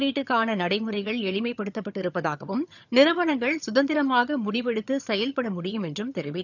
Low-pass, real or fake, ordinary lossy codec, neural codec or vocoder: 7.2 kHz; fake; none; vocoder, 22.05 kHz, 80 mel bands, HiFi-GAN